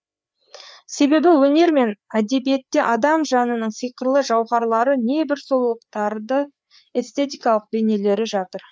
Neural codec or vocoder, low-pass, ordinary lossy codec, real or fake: codec, 16 kHz, 4 kbps, FreqCodec, larger model; none; none; fake